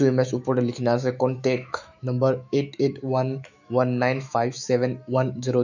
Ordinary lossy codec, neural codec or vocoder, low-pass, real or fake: none; autoencoder, 48 kHz, 128 numbers a frame, DAC-VAE, trained on Japanese speech; 7.2 kHz; fake